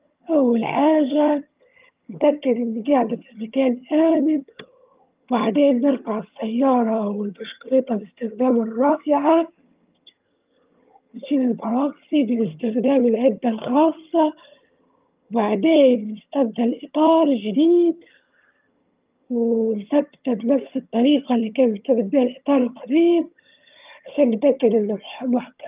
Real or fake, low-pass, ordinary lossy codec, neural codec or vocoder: fake; 3.6 kHz; Opus, 24 kbps; vocoder, 22.05 kHz, 80 mel bands, HiFi-GAN